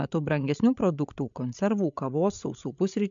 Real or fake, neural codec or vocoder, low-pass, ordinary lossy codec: fake; codec, 16 kHz, 8 kbps, FreqCodec, larger model; 7.2 kHz; MP3, 64 kbps